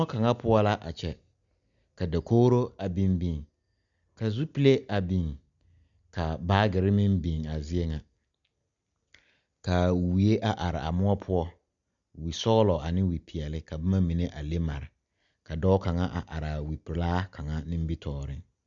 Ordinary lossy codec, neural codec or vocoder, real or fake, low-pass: MP3, 96 kbps; none; real; 7.2 kHz